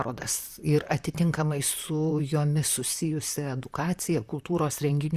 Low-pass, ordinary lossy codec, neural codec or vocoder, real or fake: 14.4 kHz; Opus, 64 kbps; vocoder, 44.1 kHz, 128 mel bands, Pupu-Vocoder; fake